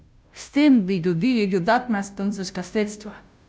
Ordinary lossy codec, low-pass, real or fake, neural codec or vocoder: none; none; fake; codec, 16 kHz, 0.5 kbps, FunCodec, trained on Chinese and English, 25 frames a second